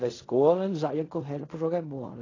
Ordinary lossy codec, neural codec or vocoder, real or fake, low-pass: AAC, 32 kbps; codec, 16 kHz in and 24 kHz out, 0.4 kbps, LongCat-Audio-Codec, fine tuned four codebook decoder; fake; 7.2 kHz